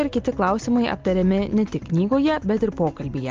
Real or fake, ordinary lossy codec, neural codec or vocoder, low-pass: real; Opus, 32 kbps; none; 7.2 kHz